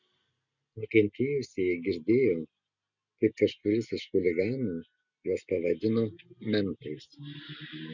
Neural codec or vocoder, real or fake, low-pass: none; real; 7.2 kHz